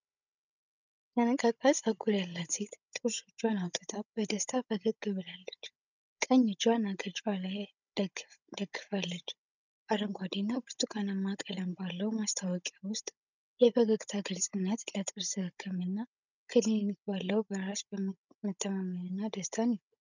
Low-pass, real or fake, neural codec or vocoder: 7.2 kHz; fake; codec, 16 kHz, 16 kbps, FunCodec, trained on Chinese and English, 50 frames a second